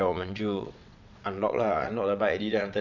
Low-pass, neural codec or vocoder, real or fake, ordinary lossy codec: 7.2 kHz; vocoder, 22.05 kHz, 80 mel bands, WaveNeXt; fake; none